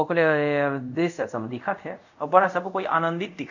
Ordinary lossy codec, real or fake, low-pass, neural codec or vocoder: none; fake; 7.2 kHz; codec, 24 kHz, 0.5 kbps, DualCodec